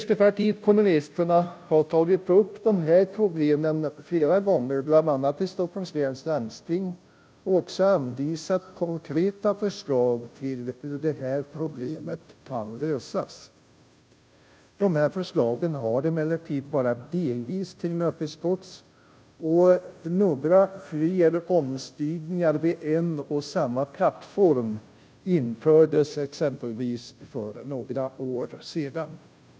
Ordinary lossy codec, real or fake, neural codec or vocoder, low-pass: none; fake; codec, 16 kHz, 0.5 kbps, FunCodec, trained on Chinese and English, 25 frames a second; none